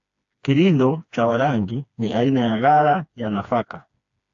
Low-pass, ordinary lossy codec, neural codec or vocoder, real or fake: 7.2 kHz; AAC, 64 kbps; codec, 16 kHz, 2 kbps, FreqCodec, smaller model; fake